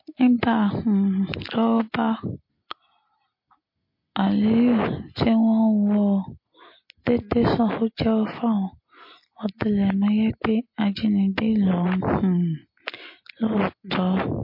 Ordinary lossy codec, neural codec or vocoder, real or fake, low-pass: MP3, 32 kbps; none; real; 5.4 kHz